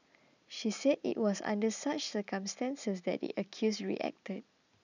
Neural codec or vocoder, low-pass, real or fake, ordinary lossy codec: none; 7.2 kHz; real; none